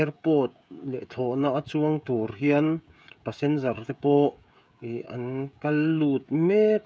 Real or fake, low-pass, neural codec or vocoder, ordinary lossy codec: fake; none; codec, 16 kHz, 16 kbps, FreqCodec, smaller model; none